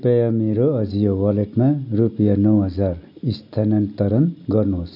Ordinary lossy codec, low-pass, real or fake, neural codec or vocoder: none; 5.4 kHz; real; none